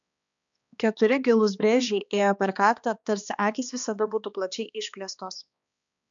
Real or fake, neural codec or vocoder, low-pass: fake; codec, 16 kHz, 2 kbps, X-Codec, HuBERT features, trained on balanced general audio; 7.2 kHz